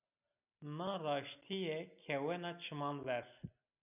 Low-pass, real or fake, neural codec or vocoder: 3.6 kHz; real; none